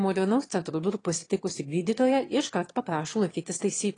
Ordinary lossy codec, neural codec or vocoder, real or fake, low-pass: AAC, 32 kbps; autoencoder, 22.05 kHz, a latent of 192 numbers a frame, VITS, trained on one speaker; fake; 9.9 kHz